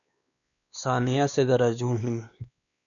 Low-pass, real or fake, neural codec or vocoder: 7.2 kHz; fake; codec, 16 kHz, 2 kbps, X-Codec, WavLM features, trained on Multilingual LibriSpeech